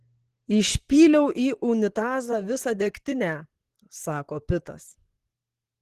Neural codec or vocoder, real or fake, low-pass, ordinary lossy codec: vocoder, 44.1 kHz, 128 mel bands, Pupu-Vocoder; fake; 14.4 kHz; Opus, 16 kbps